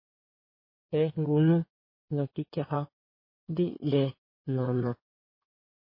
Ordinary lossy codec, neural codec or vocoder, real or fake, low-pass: MP3, 24 kbps; codec, 16 kHz in and 24 kHz out, 2.2 kbps, FireRedTTS-2 codec; fake; 5.4 kHz